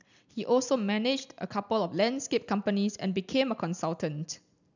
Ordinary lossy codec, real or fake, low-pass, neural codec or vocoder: none; real; 7.2 kHz; none